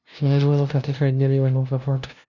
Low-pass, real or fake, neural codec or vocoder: 7.2 kHz; fake; codec, 16 kHz, 0.5 kbps, FunCodec, trained on LibriTTS, 25 frames a second